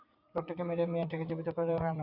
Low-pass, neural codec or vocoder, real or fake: 5.4 kHz; vocoder, 44.1 kHz, 80 mel bands, Vocos; fake